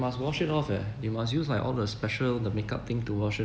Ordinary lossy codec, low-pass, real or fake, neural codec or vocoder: none; none; real; none